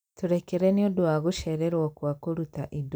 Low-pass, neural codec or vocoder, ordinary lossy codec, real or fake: none; none; none; real